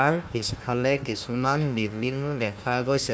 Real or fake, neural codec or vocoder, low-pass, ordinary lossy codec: fake; codec, 16 kHz, 1 kbps, FunCodec, trained on Chinese and English, 50 frames a second; none; none